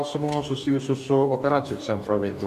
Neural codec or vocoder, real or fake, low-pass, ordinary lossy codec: codec, 44.1 kHz, 2.6 kbps, DAC; fake; 14.4 kHz; MP3, 96 kbps